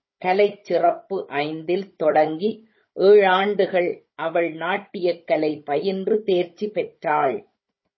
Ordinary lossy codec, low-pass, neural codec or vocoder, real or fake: MP3, 24 kbps; 7.2 kHz; codec, 16 kHz, 16 kbps, FunCodec, trained on Chinese and English, 50 frames a second; fake